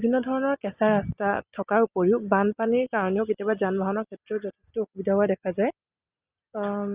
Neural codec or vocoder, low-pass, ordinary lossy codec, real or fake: none; 3.6 kHz; Opus, 64 kbps; real